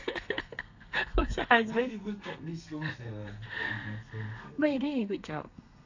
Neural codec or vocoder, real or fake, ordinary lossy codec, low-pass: codec, 32 kHz, 1.9 kbps, SNAC; fake; AAC, 48 kbps; 7.2 kHz